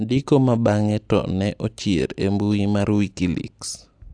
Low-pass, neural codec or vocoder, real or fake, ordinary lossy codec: 9.9 kHz; none; real; AAC, 64 kbps